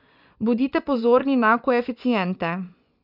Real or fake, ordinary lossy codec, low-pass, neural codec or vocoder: fake; none; 5.4 kHz; vocoder, 24 kHz, 100 mel bands, Vocos